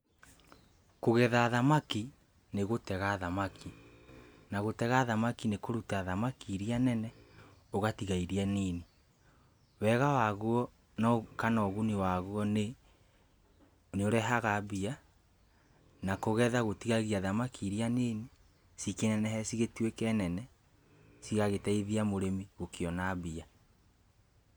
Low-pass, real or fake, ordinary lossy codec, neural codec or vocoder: none; real; none; none